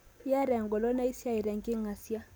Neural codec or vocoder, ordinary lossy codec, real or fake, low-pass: none; none; real; none